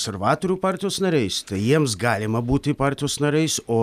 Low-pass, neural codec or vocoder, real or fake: 14.4 kHz; none; real